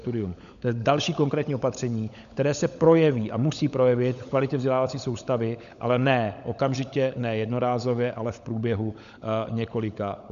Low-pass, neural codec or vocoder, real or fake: 7.2 kHz; codec, 16 kHz, 16 kbps, FunCodec, trained on LibriTTS, 50 frames a second; fake